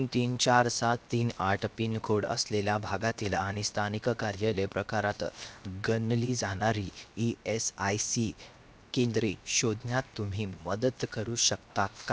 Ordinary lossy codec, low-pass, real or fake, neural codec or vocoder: none; none; fake; codec, 16 kHz, 0.7 kbps, FocalCodec